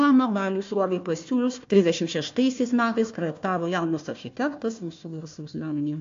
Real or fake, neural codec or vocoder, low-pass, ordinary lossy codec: fake; codec, 16 kHz, 1 kbps, FunCodec, trained on Chinese and English, 50 frames a second; 7.2 kHz; AAC, 48 kbps